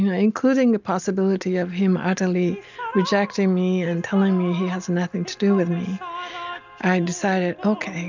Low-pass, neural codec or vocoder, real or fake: 7.2 kHz; none; real